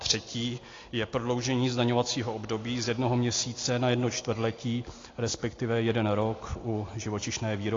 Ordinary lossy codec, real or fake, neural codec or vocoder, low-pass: AAC, 32 kbps; real; none; 7.2 kHz